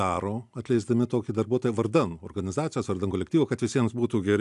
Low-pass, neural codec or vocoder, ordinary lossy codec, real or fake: 10.8 kHz; none; AAC, 96 kbps; real